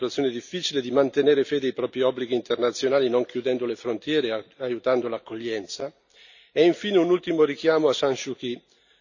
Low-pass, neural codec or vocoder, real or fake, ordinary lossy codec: 7.2 kHz; none; real; none